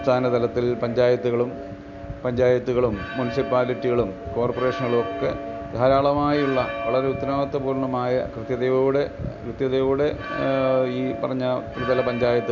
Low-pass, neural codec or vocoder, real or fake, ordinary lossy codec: 7.2 kHz; none; real; none